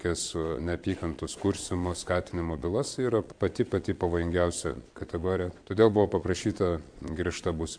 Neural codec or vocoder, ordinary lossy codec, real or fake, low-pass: vocoder, 22.05 kHz, 80 mel bands, Vocos; MP3, 64 kbps; fake; 9.9 kHz